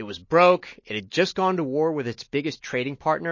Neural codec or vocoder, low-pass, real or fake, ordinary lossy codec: none; 7.2 kHz; real; MP3, 32 kbps